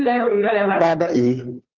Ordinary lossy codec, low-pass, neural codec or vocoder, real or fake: Opus, 24 kbps; 7.2 kHz; codec, 16 kHz, 2 kbps, FunCodec, trained on Chinese and English, 25 frames a second; fake